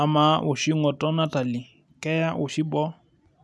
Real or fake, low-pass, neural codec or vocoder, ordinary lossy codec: real; none; none; none